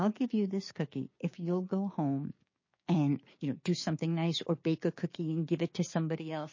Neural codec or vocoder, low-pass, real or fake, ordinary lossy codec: codec, 16 kHz, 6 kbps, DAC; 7.2 kHz; fake; MP3, 32 kbps